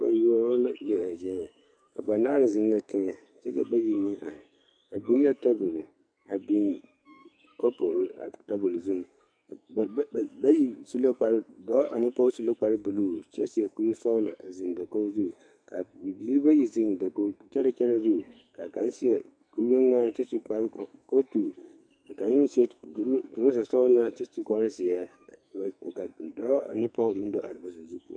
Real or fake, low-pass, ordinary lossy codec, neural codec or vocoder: fake; 9.9 kHz; MP3, 96 kbps; codec, 44.1 kHz, 2.6 kbps, SNAC